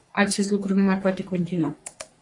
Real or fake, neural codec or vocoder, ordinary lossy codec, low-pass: fake; codec, 44.1 kHz, 3.4 kbps, Pupu-Codec; AAC, 48 kbps; 10.8 kHz